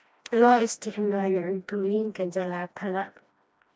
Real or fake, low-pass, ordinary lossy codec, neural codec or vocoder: fake; none; none; codec, 16 kHz, 1 kbps, FreqCodec, smaller model